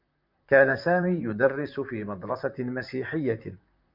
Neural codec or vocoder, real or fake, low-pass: vocoder, 44.1 kHz, 128 mel bands, Pupu-Vocoder; fake; 5.4 kHz